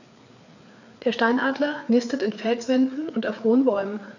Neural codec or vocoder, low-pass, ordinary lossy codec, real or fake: codec, 16 kHz, 4 kbps, FreqCodec, larger model; 7.2 kHz; none; fake